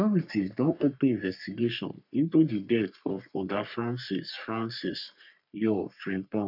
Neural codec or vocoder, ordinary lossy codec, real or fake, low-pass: codec, 44.1 kHz, 3.4 kbps, Pupu-Codec; none; fake; 5.4 kHz